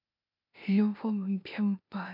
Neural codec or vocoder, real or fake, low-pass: codec, 16 kHz, 0.8 kbps, ZipCodec; fake; 5.4 kHz